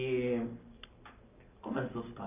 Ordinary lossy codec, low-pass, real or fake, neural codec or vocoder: none; 3.6 kHz; real; none